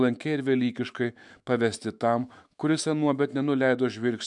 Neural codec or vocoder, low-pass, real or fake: autoencoder, 48 kHz, 128 numbers a frame, DAC-VAE, trained on Japanese speech; 10.8 kHz; fake